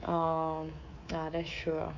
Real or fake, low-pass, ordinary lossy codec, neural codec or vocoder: fake; 7.2 kHz; AAC, 48 kbps; codec, 24 kHz, 3.1 kbps, DualCodec